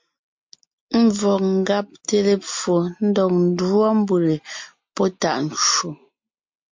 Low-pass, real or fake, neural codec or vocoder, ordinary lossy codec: 7.2 kHz; real; none; MP3, 48 kbps